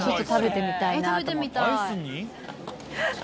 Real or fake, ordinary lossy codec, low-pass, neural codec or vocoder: real; none; none; none